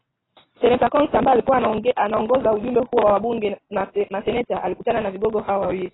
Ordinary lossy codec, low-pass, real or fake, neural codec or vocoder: AAC, 16 kbps; 7.2 kHz; real; none